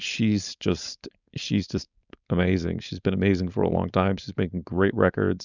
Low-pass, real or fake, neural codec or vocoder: 7.2 kHz; fake; codec, 16 kHz, 4.8 kbps, FACodec